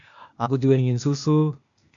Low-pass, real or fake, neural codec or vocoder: 7.2 kHz; fake; codec, 16 kHz, 0.8 kbps, ZipCodec